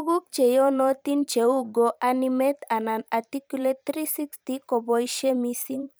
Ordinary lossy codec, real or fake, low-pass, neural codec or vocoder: none; fake; none; vocoder, 44.1 kHz, 128 mel bands every 512 samples, BigVGAN v2